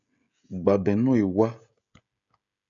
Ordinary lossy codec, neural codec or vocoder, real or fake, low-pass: AAC, 64 kbps; codec, 16 kHz, 16 kbps, FreqCodec, smaller model; fake; 7.2 kHz